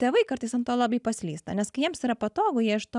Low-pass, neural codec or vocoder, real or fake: 10.8 kHz; none; real